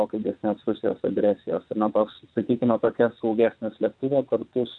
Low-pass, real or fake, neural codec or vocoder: 9.9 kHz; fake; vocoder, 22.05 kHz, 80 mel bands, WaveNeXt